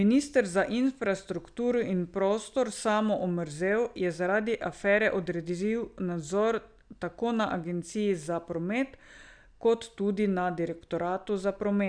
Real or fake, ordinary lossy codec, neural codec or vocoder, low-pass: real; none; none; 9.9 kHz